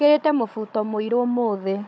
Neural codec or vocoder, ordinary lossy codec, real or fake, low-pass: codec, 16 kHz, 16 kbps, FunCodec, trained on Chinese and English, 50 frames a second; none; fake; none